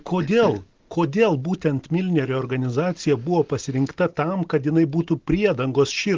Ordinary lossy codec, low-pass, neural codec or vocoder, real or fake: Opus, 16 kbps; 7.2 kHz; none; real